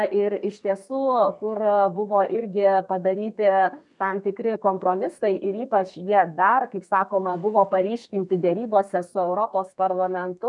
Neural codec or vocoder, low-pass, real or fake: codec, 32 kHz, 1.9 kbps, SNAC; 10.8 kHz; fake